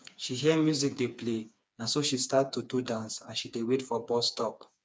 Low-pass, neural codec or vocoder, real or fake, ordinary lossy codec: none; codec, 16 kHz, 4 kbps, FreqCodec, smaller model; fake; none